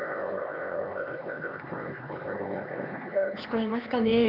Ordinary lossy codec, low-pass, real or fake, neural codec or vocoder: none; 5.4 kHz; fake; codec, 16 kHz, 2 kbps, X-Codec, HuBERT features, trained on LibriSpeech